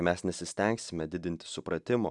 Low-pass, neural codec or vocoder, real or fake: 10.8 kHz; none; real